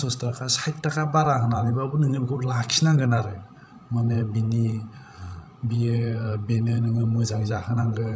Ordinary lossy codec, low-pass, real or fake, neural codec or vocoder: none; none; fake; codec, 16 kHz, 16 kbps, FreqCodec, larger model